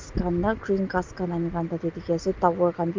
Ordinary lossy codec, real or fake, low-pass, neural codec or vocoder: Opus, 32 kbps; real; 7.2 kHz; none